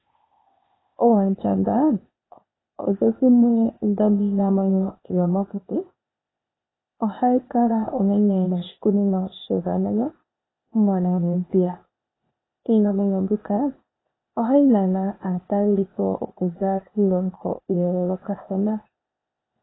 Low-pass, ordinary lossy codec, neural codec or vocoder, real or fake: 7.2 kHz; AAC, 16 kbps; codec, 16 kHz, 0.8 kbps, ZipCodec; fake